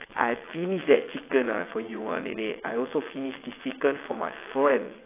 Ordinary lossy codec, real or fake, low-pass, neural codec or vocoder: AAC, 24 kbps; fake; 3.6 kHz; vocoder, 22.05 kHz, 80 mel bands, WaveNeXt